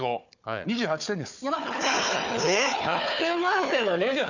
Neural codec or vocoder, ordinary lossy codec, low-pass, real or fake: codec, 16 kHz, 4 kbps, FunCodec, trained on Chinese and English, 50 frames a second; none; 7.2 kHz; fake